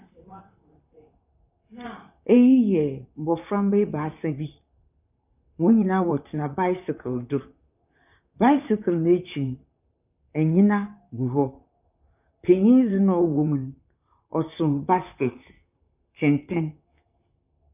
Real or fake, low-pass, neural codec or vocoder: fake; 3.6 kHz; vocoder, 44.1 kHz, 128 mel bands, Pupu-Vocoder